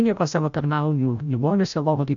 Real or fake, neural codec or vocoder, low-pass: fake; codec, 16 kHz, 0.5 kbps, FreqCodec, larger model; 7.2 kHz